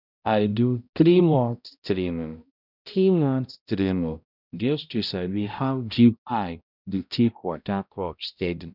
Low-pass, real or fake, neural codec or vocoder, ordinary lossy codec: 5.4 kHz; fake; codec, 16 kHz, 0.5 kbps, X-Codec, HuBERT features, trained on balanced general audio; none